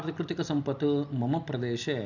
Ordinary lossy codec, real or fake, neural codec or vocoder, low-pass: none; real; none; 7.2 kHz